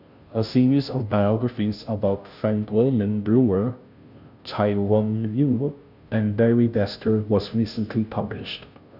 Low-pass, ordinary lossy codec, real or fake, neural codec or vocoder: 5.4 kHz; none; fake; codec, 16 kHz, 0.5 kbps, FunCodec, trained on Chinese and English, 25 frames a second